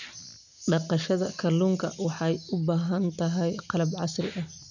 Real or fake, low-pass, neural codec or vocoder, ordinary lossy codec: real; 7.2 kHz; none; none